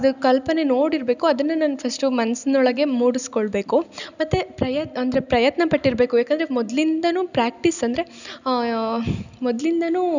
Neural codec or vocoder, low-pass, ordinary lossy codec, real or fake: none; 7.2 kHz; none; real